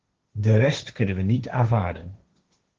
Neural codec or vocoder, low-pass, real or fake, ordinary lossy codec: codec, 16 kHz, 1.1 kbps, Voila-Tokenizer; 7.2 kHz; fake; Opus, 16 kbps